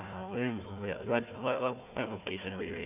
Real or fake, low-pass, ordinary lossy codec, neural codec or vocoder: fake; 3.6 kHz; none; codec, 16 kHz, 1 kbps, FunCodec, trained on Chinese and English, 50 frames a second